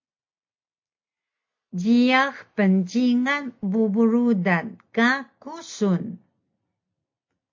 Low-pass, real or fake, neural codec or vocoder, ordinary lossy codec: 7.2 kHz; real; none; MP3, 48 kbps